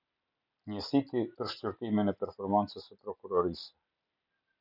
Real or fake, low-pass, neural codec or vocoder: real; 5.4 kHz; none